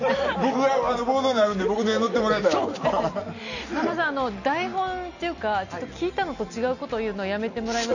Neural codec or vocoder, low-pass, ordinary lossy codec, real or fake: none; 7.2 kHz; none; real